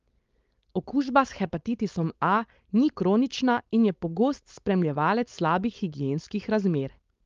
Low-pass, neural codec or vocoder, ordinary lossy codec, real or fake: 7.2 kHz; codec, 16 kHz, 4.8 kbps, FACodec; Opus, 32 kbps; fake